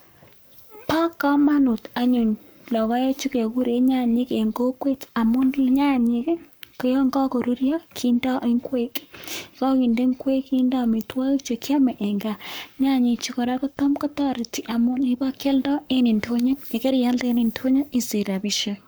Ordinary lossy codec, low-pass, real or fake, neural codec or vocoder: none; none; fake; codec, 44.1 kHz, 7.8 kbps, Pupu-Codec